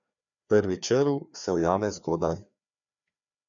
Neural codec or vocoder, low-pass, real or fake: codec, 16 kHz, 2 kbps, FreqCodec, larger model; 7.2 kHz; fake